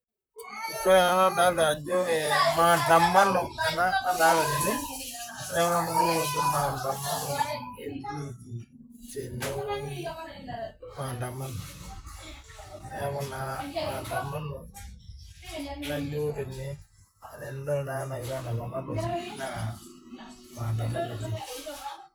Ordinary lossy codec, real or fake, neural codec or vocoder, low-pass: none; fake; vocoder, 44.1 kHz, 128 mel bands, Pupu-Vocoder; none